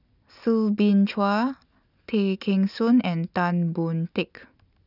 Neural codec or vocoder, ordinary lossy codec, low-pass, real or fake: none; none; 5.4 kHz; real